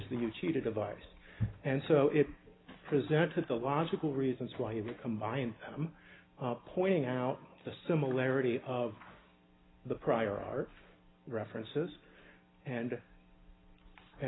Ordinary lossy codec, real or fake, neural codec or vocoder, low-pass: AAC, 16 kbps; real; none; 7.2 kHz